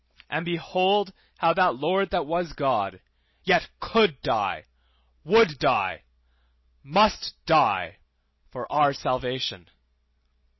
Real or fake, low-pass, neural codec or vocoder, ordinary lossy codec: real; 7.2 kHz; none; MP3, 24 kbps